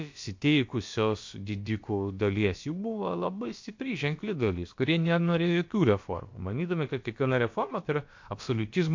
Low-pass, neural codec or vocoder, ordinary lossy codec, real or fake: 7.2 kHz; codec, 16 kHz, about 1 kbps, DyCAST, with the encoder's durations; MP3, 48 kbps; fake